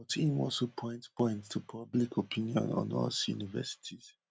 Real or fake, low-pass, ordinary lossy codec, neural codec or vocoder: real; none; none; none